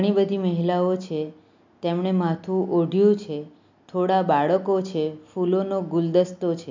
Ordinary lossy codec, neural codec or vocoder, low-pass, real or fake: MP3, 64 kbps; none; 7.2 kHz; real